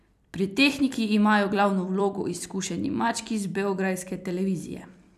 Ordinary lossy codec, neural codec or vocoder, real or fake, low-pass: none; vocoder, 44.1 kHz, 128 mel bands every 256 samples, BigVGAN v2; fake; 14.4 kHz